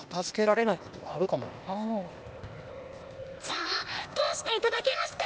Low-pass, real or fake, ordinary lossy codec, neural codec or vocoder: none; fake; none; codec, 16 kHz, 0.8 kbps, ZipCodec